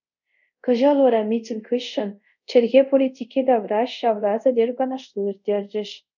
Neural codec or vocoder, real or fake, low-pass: codec, 24 kHz, 0.5 kbps, DualCodec; fake; 7.2 kHz